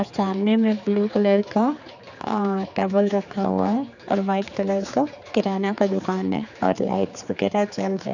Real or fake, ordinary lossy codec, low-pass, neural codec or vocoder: fake; none; 7.2 kHz; codec, 16 kHz, 4 kbps, X-Codec, HuBERT features, trained on general audio